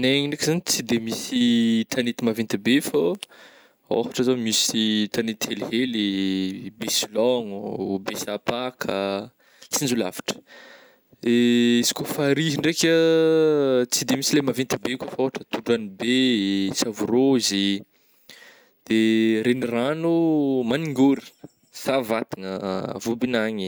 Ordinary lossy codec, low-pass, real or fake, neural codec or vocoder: none; none; real; none